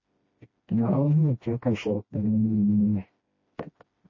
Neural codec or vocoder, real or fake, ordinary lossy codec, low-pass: codec, 16 kHz, 1 kbps, FreqCodec, smaller model; fake; MP3, 32 kbps; 7.2 kHz